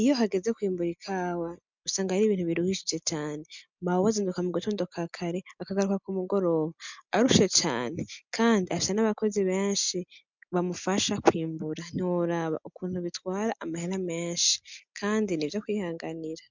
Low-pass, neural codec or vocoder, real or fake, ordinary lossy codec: 7.2 kHz; none; real; MP3, 64 kbps